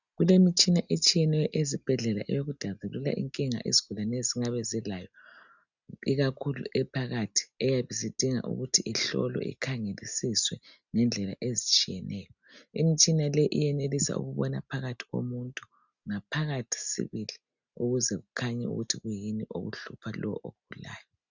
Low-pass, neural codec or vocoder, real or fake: 7.2 kHz; none; real